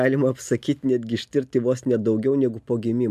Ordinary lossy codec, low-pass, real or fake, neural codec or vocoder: AAC, 96 kbps; 14.4 kHz; real; none